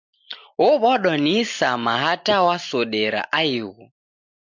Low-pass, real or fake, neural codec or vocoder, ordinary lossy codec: 7.2 kHz; real; none; MP3, 64 kbps